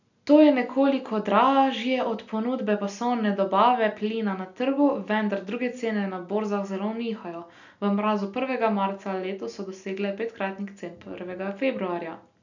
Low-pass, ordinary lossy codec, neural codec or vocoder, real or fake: 7.2 kHz; none; none; real